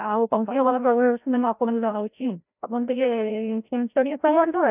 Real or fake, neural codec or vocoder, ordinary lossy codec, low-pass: fake; codec, 16 kHz, 0.5 kbps, FreqCodec, larger model; none; 3.6 kHz